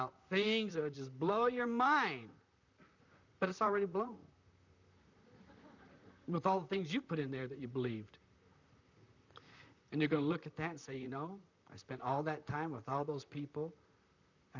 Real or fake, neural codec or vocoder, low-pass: fake; vocoder, 44.1 kHz, 128 mel bands, Pupu-Vocoder; 7.2 kHz